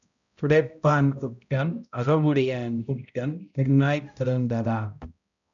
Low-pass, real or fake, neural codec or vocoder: 7.2 kHz; fake; codec, 16 kHz, 0.5 kbps, X-Codec, HuBERT features, trained on balanced general audio